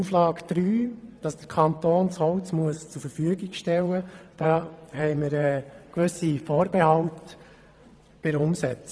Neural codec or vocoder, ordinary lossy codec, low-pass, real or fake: vocoder, 22.05 kHz, 80 mel bands, WaveNeXt; none; none; fake